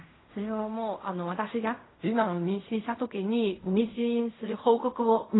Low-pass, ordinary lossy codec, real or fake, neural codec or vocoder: 7.2 kHz; AAC, 16 kbps; fake; codec, 16 kHz in and 24 kHz out, 0.4 kbps, LongCat-Audio-Codec, fine tuned four codebook decoder